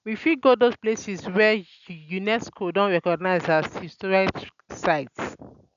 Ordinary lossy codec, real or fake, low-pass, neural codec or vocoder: none; real; 7.2 kHz; none